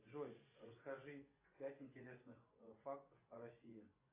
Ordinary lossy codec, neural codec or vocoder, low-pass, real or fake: AAC, 24 kbps; vocoder, 24 kHz, 100 mel bands, Vocos; 3.6 kHz; fake